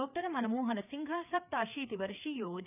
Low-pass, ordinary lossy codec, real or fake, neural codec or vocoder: 3.6 kHz; none; fake; codec, 16 kHz, 4 kbps, FreqCodec, larger model